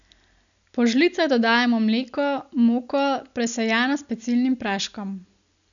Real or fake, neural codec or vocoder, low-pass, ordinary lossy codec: real; none; 7.2 kHz; none